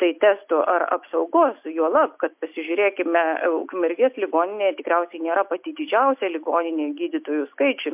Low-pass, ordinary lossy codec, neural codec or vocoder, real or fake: 3.6 kHz; MP3, 32 kbps; none; real